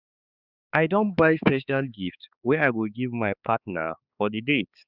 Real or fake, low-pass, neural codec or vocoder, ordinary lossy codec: fake; 5.4 kHz; codec, 16 kHz, 4 kbps, X-Codec, HuBERT features, trained on balanced general audio; Opus, 64 kbps